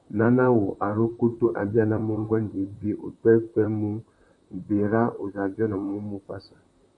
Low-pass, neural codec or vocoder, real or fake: 10.8 kHz; vocoder, 44.1 kHz, 128 mel bands, Pupu-Vocoder; fake